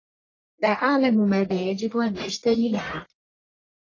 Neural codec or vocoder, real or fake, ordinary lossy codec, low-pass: codec, 44.1 kHz, 1.7 kbps, Pupu-Codec; fake; AAC, 32 kbps; 7.2 kHz